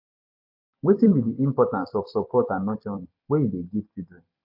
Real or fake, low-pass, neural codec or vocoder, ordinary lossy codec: fake; 5.4 kHz; vocoder, 44.1 kHz, 128 mel bands every 256 samples, BigVGAN v2; AAC, 48 kbps